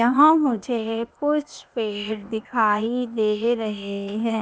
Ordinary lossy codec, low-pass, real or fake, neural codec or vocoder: none; none; fake; codec, 16 kHz, 0.8 kbps, ZipCodec